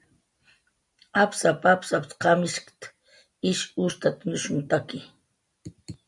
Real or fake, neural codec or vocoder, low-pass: real; none; 10.8 kHz